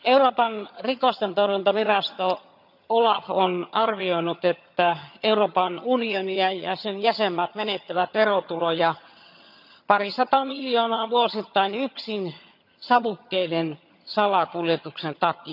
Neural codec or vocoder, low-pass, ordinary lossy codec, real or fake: vocoder, 22.05 kHz, 80 mel bands, HiFi-GAN; 5.4 kHz; none; fake